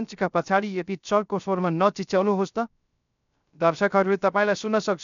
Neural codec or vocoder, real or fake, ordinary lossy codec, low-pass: codec, 16 kHz, 0.3 kbps, FocalCodec; fake; none; 7.2 kHz